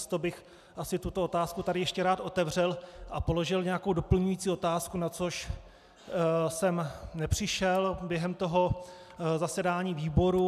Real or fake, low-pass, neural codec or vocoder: real; 14.4 kHz; none